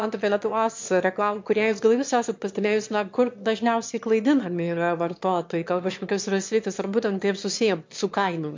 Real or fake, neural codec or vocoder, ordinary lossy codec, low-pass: fake; autoencoder, 22.05 kHz, a latent of 192 numbers a frame, VITS, trained on one speaker; MP3, 48 kbps; 7.2 kHz